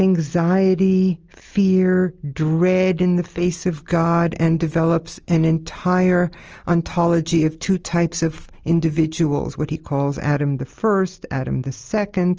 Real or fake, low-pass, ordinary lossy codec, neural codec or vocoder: real; 7.2 kHz; Opus, 24 kbps; none